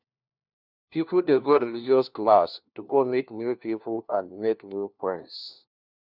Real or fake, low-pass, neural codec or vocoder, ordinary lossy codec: fake; 5.4 kHz; codec, 16 kHz, 1 kbps, FunCodec, trained on LibriTTS, 50 frames a second; none